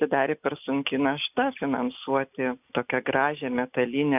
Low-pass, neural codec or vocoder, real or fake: 3.6 kHz; none; real